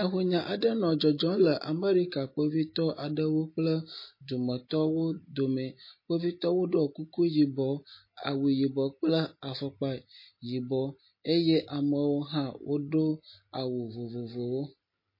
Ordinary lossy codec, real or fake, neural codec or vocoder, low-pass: MP3, 24 kbps; real; none; 5.4 kHz